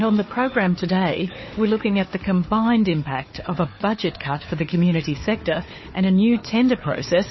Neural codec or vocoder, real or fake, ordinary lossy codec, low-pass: codec, 16 kHz, 4 kbps, FunCodec, trained on Chinese and English, 50 frames a second; fake; MP3, 24 kbps; 7.2 kHz